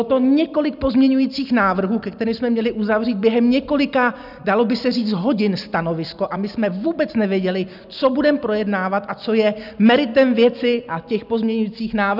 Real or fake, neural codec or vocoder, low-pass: real; none; 5.4 kHz